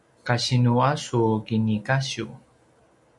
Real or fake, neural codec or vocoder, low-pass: real; none; 10.8 kHz